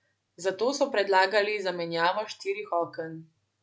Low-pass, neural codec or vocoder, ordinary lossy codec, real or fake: none; none; none; real